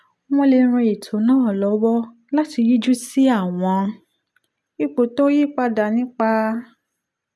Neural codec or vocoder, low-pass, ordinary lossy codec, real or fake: none; none; none; real